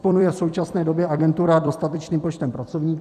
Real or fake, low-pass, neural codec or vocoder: fake; 14.4 kHz; vocoder, 44.1 kHz, 128 mel bands every 256 samples, BigVGAN v2